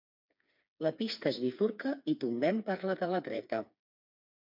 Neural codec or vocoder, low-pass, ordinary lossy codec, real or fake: codec, 16 kHz, 4 kbps, FreqCodec, smaller model; 5.4 kHz; MP3, 48 kbps; fake